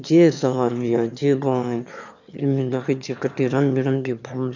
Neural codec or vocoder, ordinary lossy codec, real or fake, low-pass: autoencoder, 22.05 kHz, a latent of 192 numbers a frame, VITS, trained on one speaker; none; fake; 7.2 kHz